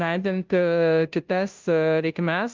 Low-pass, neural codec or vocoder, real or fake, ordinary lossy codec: 7.2 kHz; codec, 16 kHz, 0.5 kbps, FunCodec, trained on Chinese and English, 25 frames a second; fake; Opus, 32 kbps